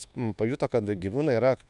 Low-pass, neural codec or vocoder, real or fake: 10.8 kHz; codec, 24 kHz, 1.2 kbps, DualCodec; fake